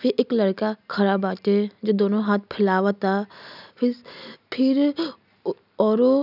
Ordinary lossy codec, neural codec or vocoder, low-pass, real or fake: none; none; 5.4 kHz; real